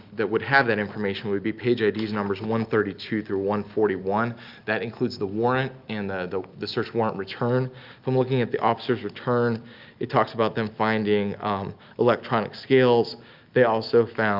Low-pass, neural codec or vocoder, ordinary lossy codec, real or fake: 5.4 kHz; none; Opus, 24 kbps; real